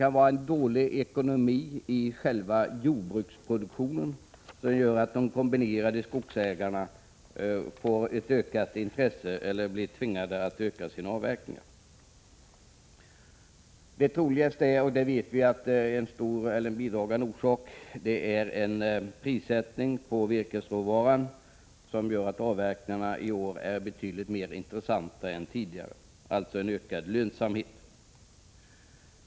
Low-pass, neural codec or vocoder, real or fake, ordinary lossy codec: none; none; real; none